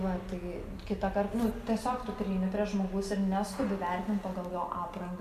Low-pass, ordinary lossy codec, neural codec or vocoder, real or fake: 14.4 kHz; MP3, 96 kbps; none; real